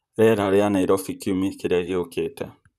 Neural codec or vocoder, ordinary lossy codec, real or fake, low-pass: vocoder, 44.1 kHz, 128 mel bands, Pupu-Vocoder; none; fake; 14.4 kHz